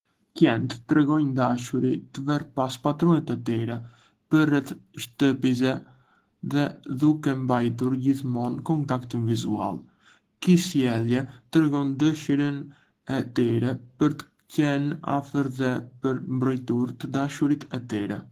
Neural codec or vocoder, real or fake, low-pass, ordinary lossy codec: codec, 44.1 kHz, 7.8 kbps, Pupu-Codec; fake; 14.4 kHz; Opus, 16 kbps